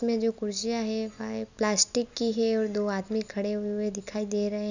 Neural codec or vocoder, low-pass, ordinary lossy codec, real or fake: none; 7.2 kHz; none; real